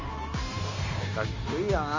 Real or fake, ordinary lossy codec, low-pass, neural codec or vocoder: fake; Opus, 32 kbps; 7.2 kHz; codec, 16 kHz, 0.9 kbps, LongCat-Audio-Codec